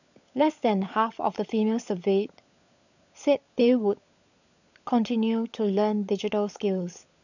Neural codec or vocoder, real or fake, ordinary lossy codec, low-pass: codec, 16 kHz, 16 kbps, FunCodec, trained on LibriTTS, 50 frames a second; fake; none; 7.2 kHz